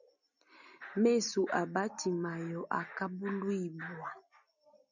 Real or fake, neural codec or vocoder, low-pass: real; none; 7.2 kHz